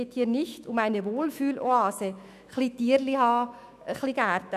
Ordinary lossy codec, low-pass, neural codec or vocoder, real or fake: none; 14.4 kHz; autoencoder, 48 kHz, 128 numbers a frame, DAC-VAE, trained on Japanese speech; fake